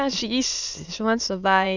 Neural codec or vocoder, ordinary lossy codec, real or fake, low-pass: autoencoder, 22.05 kHz, a latent of 192 numbers a frame, VITS, trained on many speakers; Opus, 64 kbps; fake; 7.2 kHz